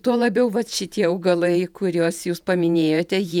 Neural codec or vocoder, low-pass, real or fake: vocoder, 48 kHz, 128 mel bands, Vocos; 19.8 kHz; fake